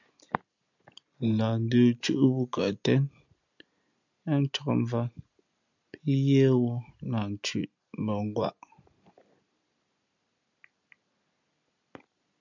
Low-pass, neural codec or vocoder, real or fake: 7.2 kHz; none; real